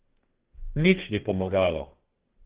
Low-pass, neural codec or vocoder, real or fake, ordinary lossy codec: 3.6 kHz; codec, 32 kHz, 1.9 kbps, SNAC; fake; Opus, 16 kbps